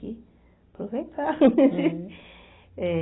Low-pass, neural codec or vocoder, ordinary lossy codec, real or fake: 7.2 kHz; none; AAC, 16 kbps; real